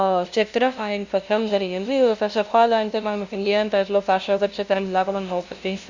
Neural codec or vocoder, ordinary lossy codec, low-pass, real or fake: codec, 16 kHz, 0.5 kbps, FunCodec, trained on LibriTTS, 25 frames a second; Opus, 64 kbps; 7.2 kHz; fake